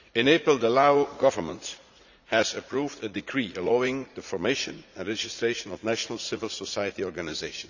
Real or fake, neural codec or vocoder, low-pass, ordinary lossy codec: fake; vocoder, 44.1 kHz, 80 mel bands, Vocos; 7.2 kHz; none